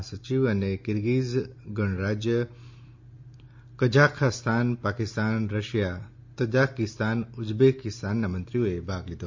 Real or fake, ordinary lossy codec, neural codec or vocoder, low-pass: real; MP3, 48 kbps; none; 7.2 kHz